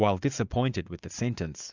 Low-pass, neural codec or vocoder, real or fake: 7.2 kHz; vocoder, 44.1 kHz, 80 mel bands, Vocos; fake